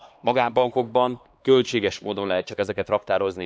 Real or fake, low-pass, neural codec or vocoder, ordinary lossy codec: fake; none; codec, 16 kHz, 2 kbps, X-Codec, HuBERT features, trained on LibriSpeech; none